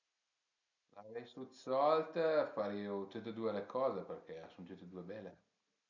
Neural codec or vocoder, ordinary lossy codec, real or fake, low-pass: none; none; real; 7.2 kHz